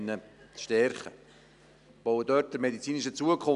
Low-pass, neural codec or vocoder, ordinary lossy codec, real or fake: 10.8 kHz; none; none; real